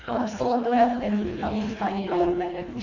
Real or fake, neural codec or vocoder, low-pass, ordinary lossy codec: fake; codec, 24 kHz, 1.5 kbps, HILCodec; 7.2 kHz; none